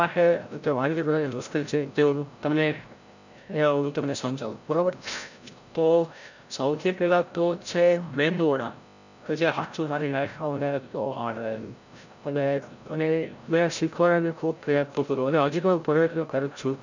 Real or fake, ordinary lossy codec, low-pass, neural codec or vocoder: fake; none; 7.2 kHz; codec, 16 kHz, 0.5 kbps, FreqCodec, larger model